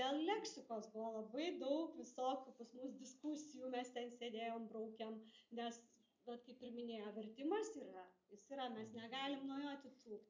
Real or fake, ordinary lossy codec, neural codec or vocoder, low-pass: real; MP3, 64 kbps; none; 7.2 kHz